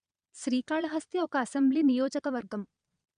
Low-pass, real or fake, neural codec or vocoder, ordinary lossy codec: 9.9 kHz; fake; vocoder, 22.05 kHz, 80 mel bands, Vocos; none